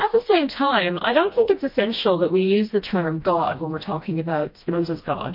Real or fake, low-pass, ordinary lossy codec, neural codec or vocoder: fake; 5.4 kHz; MP3, 32 kbps; codec, 16 kHz, 1 kbps, FreqCodec, smaller model